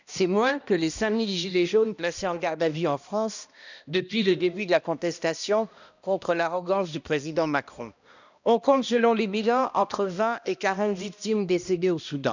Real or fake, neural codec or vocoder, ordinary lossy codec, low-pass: fake; codec, 16 kHz, 1 kbps, X-Codec, HuBERT features, trained on balanced general audio; none; 7.2 kHz